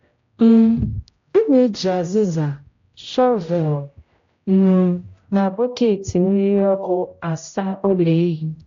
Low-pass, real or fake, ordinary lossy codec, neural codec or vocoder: 7.2 kHz; fake; AAC, 48 kbps; codec, 16 kHz, 0.5 kbps, X-Codec, HuBERT features, trained on general audio